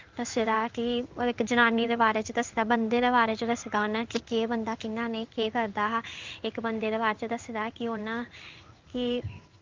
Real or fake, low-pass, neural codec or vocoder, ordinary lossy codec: fake; 7.2 kHz; codec, 16 kHz in and 24 kHz out, 1 kbps, XY-Tokenizer; Opus, 32 kbps